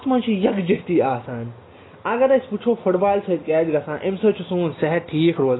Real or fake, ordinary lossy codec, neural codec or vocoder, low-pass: real; AAC, 16 kbps; none; 7.2 kHz